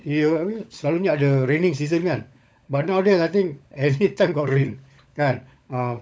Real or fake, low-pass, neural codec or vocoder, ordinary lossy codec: fake; none; codec, 16 kHz, 16 kbps, FunCodec, trained on LibriTTS, 50 frames a second; none